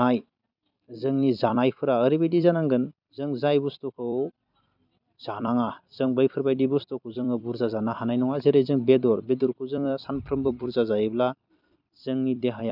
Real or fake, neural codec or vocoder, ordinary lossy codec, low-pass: fake; vocoder, 44.1 kHz, 128 mel bands every 256 samples, BigVGAN v2; none; 5.4 kHz